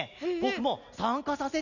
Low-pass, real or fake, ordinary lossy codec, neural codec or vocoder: 7.2 kHz; real; none; none